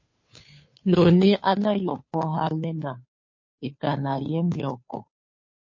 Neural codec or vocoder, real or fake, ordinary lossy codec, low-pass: codec, 16 kHz, 2 kbps, FunCodec, trained on Chinese and English, 25 frames a second; fake; MP3, 32 kbps; 7.2 kHz